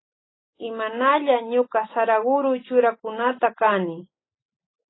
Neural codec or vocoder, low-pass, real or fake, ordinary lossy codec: none; 7.2 kHz; real; AAC, 16 kbps